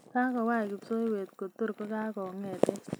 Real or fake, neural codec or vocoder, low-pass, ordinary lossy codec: real; none; none; none